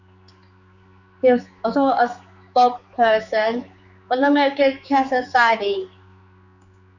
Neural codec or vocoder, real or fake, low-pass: codec, 16 kHz, 4 kbps, X-Codec, HuBERT features, trained on general audio; fake; 7.2 kHz